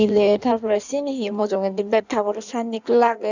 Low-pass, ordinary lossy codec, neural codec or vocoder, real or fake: 7.2 kHz; none; codec, 16 kHz in and 24 kHz out, 1.1 kbps, FireRedTTS-2 codec; fake